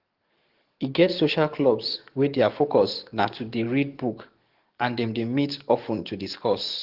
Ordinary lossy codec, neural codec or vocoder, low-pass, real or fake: Opus, 16 kbps; codec, 44.1 kHz, 7.8 kbps, DAC; 5.4 kHz; fake